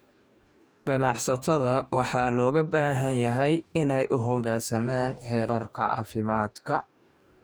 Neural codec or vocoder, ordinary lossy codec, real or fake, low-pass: codec, 44.1 kHz, 2.6 kbps, DAC; none; fake; none